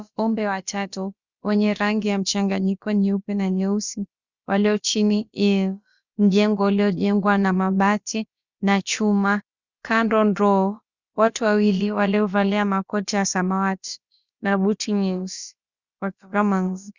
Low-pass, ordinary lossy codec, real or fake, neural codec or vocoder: 7.2 kHz; Opus, 64 kbps; fake; codec, 16 kHz, about 1 kbps, DyCAST, with the encoder's durations